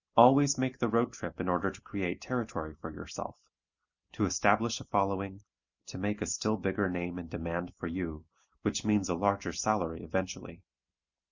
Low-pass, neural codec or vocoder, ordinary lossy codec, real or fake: 7.2 kHz; none; Opus, 64 kbps; real